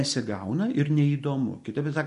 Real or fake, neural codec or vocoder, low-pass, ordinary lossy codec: real; none; 10.8 kHz; MP3, 48 kbps